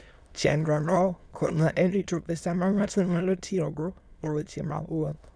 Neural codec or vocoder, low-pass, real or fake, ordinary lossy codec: autoencoder, 22.05 kHz, a latent of 192 numbers a frame, VITS, trained on many speakers; none; fake; none